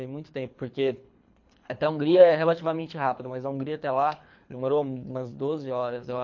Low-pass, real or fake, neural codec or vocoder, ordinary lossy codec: 7.2 kHz; fake; codec, 24 kHz, 3 kbps, HILCodec; MP3, 48 kbps